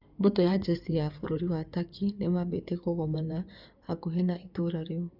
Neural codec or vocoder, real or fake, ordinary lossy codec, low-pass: codec, 16 kHz in and 24 kHz out, 2.2 kbps, FireRedTTS-2 codec; fake; none; 5.4 kHz